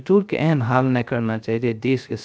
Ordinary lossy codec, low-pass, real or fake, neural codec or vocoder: none; none; fake; codec, 16 kHz, 0.3 kbps, FocalCodec